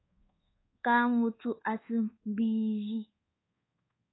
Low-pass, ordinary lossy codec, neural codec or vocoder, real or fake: 7.2 kHz; AAC, 16 kbps; codec, 24 kHz, 1.2 kbps, DualCodec; fake